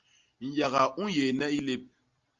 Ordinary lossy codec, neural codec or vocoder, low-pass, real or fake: Opus, 32 kbps; none; 7.2 kHz; real